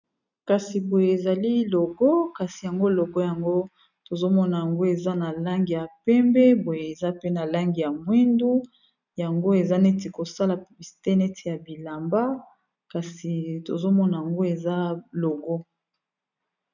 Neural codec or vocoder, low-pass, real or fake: none; 7.2 kHz; real